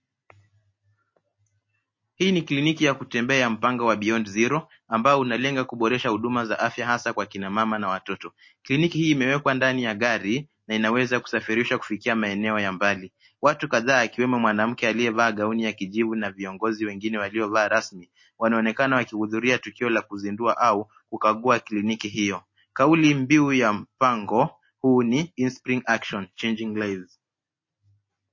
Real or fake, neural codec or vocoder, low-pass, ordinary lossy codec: real; none; 7.2 kHz; MP3, 32 kbps